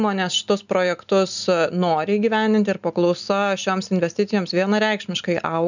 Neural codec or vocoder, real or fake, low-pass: none; real; 7.2 kHz